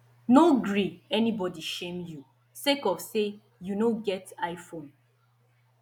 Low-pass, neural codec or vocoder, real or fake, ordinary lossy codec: 19.8 kHz; none; real; none